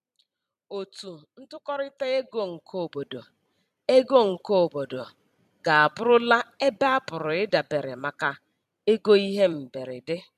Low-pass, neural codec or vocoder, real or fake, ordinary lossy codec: 14.4 kHz; vocoder, 44.1 kHz, 128 mel bands every 256 samples, BigVGAN v2; fake; none